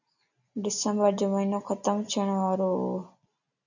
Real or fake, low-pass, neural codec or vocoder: real; 7.2 kHz; none